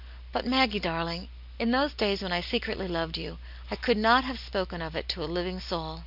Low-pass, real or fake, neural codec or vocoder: 5.4 kHz; real; none